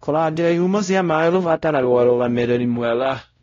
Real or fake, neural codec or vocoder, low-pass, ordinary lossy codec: fake; codec, 16 kHz, 0.5 kbps, X-Codec, WavLM features, trained on Multilingual LibriSpeech; 7.2 kHz; AAC, 32 kbps